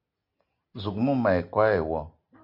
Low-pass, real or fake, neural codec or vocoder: 5.4 kHz; real; none